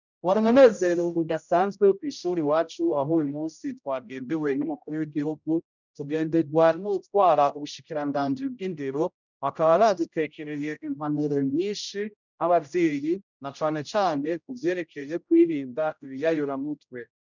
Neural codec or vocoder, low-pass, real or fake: codec, 16 kHz, 0.5 kbps, X-Codec, HuBERT features, trained on general audio; 7.2 kHz; fake